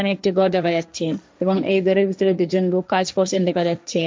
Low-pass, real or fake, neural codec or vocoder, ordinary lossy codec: none; fake; codec, 16 kHz, 1.1 kbps, Voila-Tokenizer; none